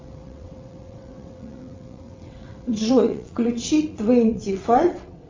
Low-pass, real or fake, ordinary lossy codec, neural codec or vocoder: 7.2 kHz; fake; MP3, 64 kbps; vocoder, 22.05 kHz, 80 mel bands, WaveNeXt